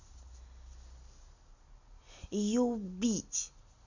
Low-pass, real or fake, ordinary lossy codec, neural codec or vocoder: 7.2 kHz; real; none; none